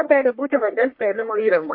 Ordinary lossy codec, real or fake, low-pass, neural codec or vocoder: MP3, 32 kbps; fake; 5.4 kHz; codec, 44.1 kHz, 1.7 kbps, Pupu-Codec